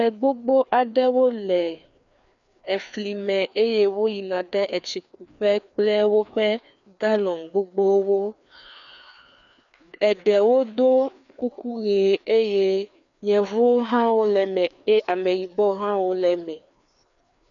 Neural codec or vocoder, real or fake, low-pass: codec, 16 kHz, 2 kbps, FreqCodec, larger model; fake; 7.2 kHz